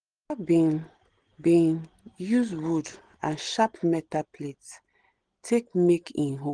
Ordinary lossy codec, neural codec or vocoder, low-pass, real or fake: Opus, 16 kbps; none; 9.9 kHz; real